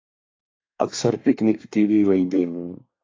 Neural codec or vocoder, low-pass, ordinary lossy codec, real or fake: codec, 24 kHz, 1 kbps, SNAC; 7.2 kHz; AAC, 32 kbps; fake